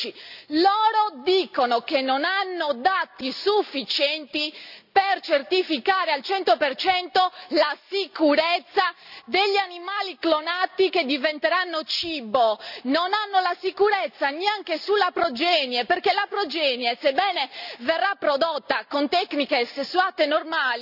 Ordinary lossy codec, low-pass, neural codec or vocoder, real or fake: none; 5.4 kHz; none; real